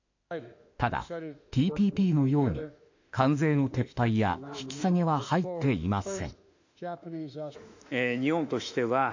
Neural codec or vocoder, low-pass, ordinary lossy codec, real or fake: autoencoder, 48 kHz, 32 numbers a frame, DAC-VAE, trained on Japanese speech; 7.2 kHz; AAC, 48 kbps; fake